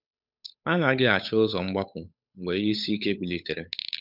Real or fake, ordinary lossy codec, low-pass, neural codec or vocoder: fake; none; 5.4 kHz; codec, 16 kHz, 8 kbps, FunCodec, trained on Chinese and English, 25 frames a second